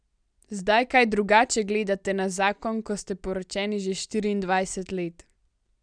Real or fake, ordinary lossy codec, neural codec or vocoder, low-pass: real; none; none; 9.9 kHz